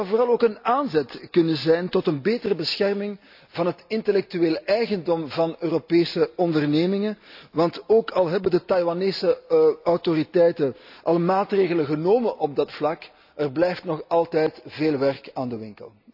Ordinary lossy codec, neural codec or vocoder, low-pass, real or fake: AAC, 48 kbps; none; 5.4 kHz; real